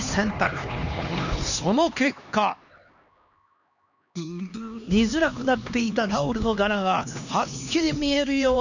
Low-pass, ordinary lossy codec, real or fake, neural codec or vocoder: 7.2 kHz; none; fake; codec, 16 kHz, 2 kbps, X-Codec, HuBERT features, trained on LibriSpeech